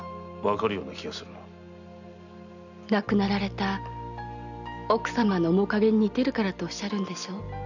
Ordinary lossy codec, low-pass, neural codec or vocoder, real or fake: none; 7.2 kHz; none; real